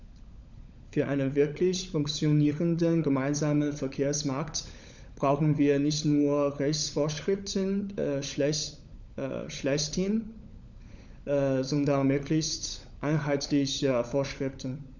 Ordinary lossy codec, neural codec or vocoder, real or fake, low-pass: none; codec, 16 kHz, 16 kbps, FunCodec, trained on LibriTTS, 50 frames a second; fake; 7.2 kHz